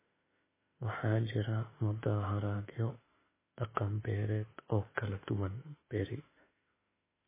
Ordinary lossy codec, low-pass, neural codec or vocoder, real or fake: MP3, 16 kbps; 3.6 kHz; autoencoder, 48 kHz, 32 numbers a frame, DAC-VAE, trained on Japanese speech; fake